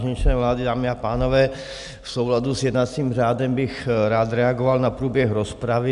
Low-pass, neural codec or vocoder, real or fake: 10.8 kHz; none; real